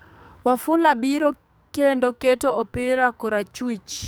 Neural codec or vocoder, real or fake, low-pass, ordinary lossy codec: codec, 44.1 kHz, 2.6 kbps, SNAC; fake; none; none